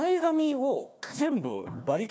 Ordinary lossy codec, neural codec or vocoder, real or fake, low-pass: none; codec, 16 kHz, 1 kbps, FunCodec, trained on Chinese and English, 50 frames a second; fake; none